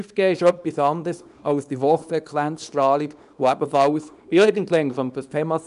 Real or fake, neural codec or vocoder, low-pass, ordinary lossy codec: fake; codec, 24 kHz, 0.9 kbps, WavTokenizer, small release; 10.8 kHz; none